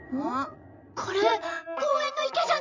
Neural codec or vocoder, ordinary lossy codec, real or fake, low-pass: vocoder, 44.1 kHz, 80 mel bands, Vocos; none; fake; 7.2 kHz